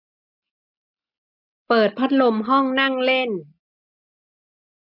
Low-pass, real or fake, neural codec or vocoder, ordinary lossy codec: 5.4 kHz; real; none; none